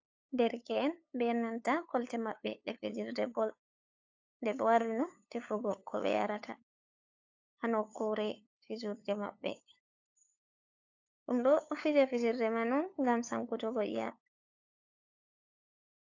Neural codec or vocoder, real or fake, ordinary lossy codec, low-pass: codec, 16 kHz, 8 kbps, FunCodec, trained on LibriTTS, 25 frames a second; fake; AAC, 48 kbps; 7.2 kHz